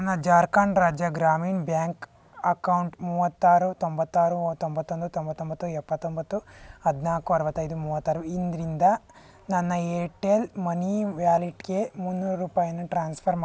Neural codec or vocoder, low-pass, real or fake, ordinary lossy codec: none; none; real; none